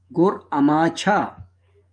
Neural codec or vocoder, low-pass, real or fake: codec, 44.1 kHz, 7.8 kbps, DAC; 9.9 kHz; fake